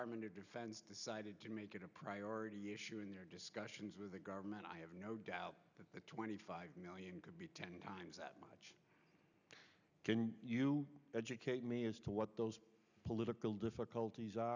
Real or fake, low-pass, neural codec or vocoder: real; 7.2 kHz; none